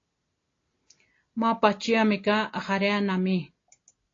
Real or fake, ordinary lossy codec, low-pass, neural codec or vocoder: real; AAC, 32 kbps; 7.2 kHz; none